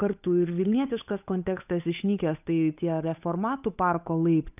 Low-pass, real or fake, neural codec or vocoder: 3.6 kHz; fake; codec, 16 kHz, 8 kbps, FunCodec, trained on Chinese and English, 25 frames a second